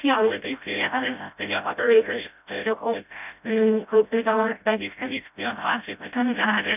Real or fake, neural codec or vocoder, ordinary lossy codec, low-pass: fake; codec, 16 kHz, 0.5 kbps, FreqCodec, smaller model; none; 3.6 kHz